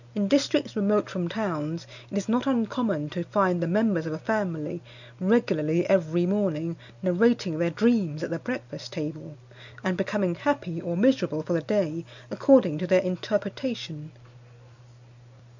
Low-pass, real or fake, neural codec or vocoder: 7.2 kHz; real; none